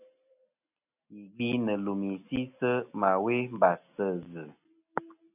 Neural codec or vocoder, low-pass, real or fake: none; 3.6 kHz; real